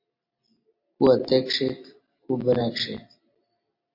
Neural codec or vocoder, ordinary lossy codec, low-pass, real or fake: none; MP3, 32 kbps; 5.4 kHz; real